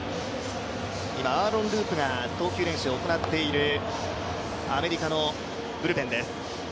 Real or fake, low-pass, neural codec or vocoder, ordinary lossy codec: real; none; none; none